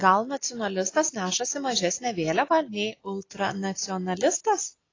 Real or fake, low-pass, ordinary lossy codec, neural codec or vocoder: real; 7.2 kHz; AAC, 32 kbps; none